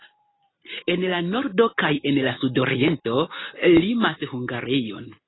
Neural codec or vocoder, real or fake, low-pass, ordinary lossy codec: none; real; 7.2 kHz; AAC, 16 kbps